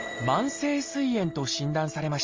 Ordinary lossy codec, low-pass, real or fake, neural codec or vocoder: Opus, 32 kbps; 7.2 kHz; real; none